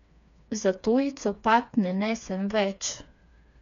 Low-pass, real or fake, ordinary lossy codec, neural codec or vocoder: 7.2 kHz; fake; none; codec, 16 kHz, 4 kbps, FreqCodec, smaller model